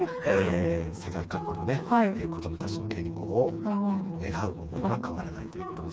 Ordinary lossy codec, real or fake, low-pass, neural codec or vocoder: none; fake; none; codec, 16 kHz, 2 kbps, FreqCodec, smaller model